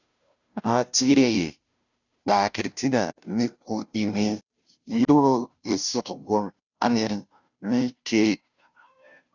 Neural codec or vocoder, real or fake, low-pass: codec, 16 kHz, 0.5 kbps, FunCodec, trained on Chinese and English, 25 frames a second; fake; 7.2 kHz